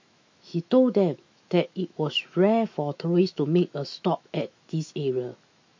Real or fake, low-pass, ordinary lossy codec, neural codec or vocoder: fake; 7.2 kHz; MP3, 48 kbps; autoencoder, 48 kHz, 128 numbers a frame, DAC-VAE, trained on Japanese speech